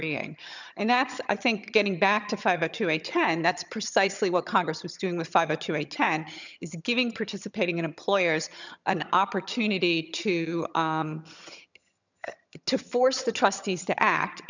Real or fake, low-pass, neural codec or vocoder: fake; 7.2 kHz; vocoder, 22.05 kHz, 80 mel bands, HiFi-GAN